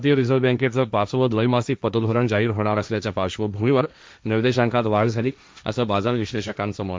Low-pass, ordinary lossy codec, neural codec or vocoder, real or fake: none; none; codec, 16 kHz, 1.1 kbps, Voila-Tokenizer; fake